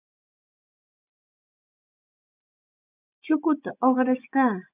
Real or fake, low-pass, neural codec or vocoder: fake; 3.6 kHz; vocoder, 24 kHz, 100 mel bands, Vocos